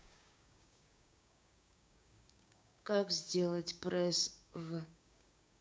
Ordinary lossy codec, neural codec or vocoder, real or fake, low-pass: none; codec, 16 kHz, 6 kbps, DAC; fake; none